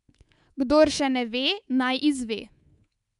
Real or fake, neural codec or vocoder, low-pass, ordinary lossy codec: fake; codec, 24 kHz, 3.1 kbps, DualCodec; 10.8 kHz; none